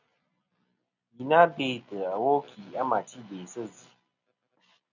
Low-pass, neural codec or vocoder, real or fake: 7.2 kHz; none; real